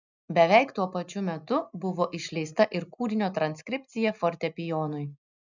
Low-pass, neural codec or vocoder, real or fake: 7.2 kHz; none; real